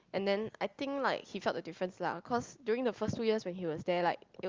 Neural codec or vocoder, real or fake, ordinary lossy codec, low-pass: none; real; Opus, 32 kbps; 7.2 kHz